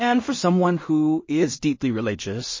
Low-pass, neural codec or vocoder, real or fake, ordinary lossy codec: 7.2 kHz; codec, 16 kHz in and 24 kHz out, 0.4 kbps, LongCat-Audio-Codec, two codebook decoder; fake; MP3, 32 kbps